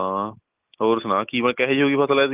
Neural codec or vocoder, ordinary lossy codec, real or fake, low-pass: none; Opus, 32 kbps; real; 3.6 kHz